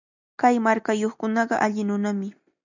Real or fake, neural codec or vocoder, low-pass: real; none; 7.2 kHz